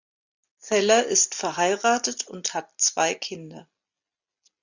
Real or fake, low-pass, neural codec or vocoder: real; 7.2 kHz; none